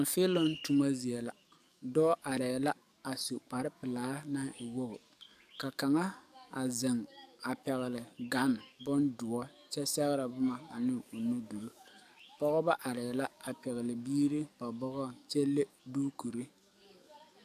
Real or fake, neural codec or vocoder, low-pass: fake; codec, 44.1 kHz, 7.8 kbps, DAC; 14.4 kHz